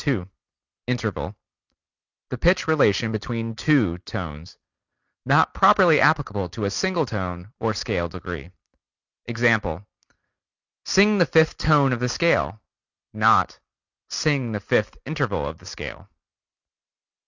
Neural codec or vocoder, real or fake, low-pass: none; real; 7.2 kHz